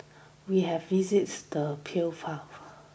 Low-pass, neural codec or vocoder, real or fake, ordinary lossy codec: none; none; real; none